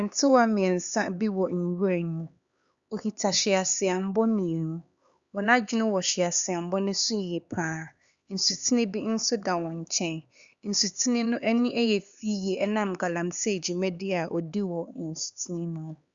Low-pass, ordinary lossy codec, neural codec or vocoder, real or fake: 7.2 kHz; Opus, 64 kbps; codec, 16 kHz, 4 kbps, X-Codec, HuBERT features, trained on LibriSpeech; fake